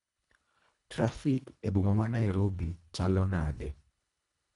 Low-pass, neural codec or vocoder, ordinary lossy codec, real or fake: 10.8 kHz; codec, 24 kHz, 1.5 kbps, HILCodec; none; fake